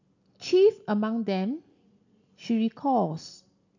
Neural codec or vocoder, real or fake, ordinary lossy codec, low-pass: none; real; none; 7.2 kHz